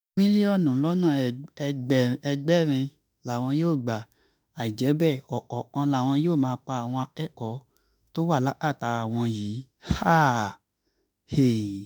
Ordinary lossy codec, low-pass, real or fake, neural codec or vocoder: none; none; fake; autoencoder, 48 kHz, 32 numbers a frame, DAC-VAE, trained on Japanese speech